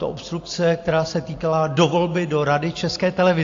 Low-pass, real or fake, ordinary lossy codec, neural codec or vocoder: 7.2 kHz; real; AAC, 48 kbps; none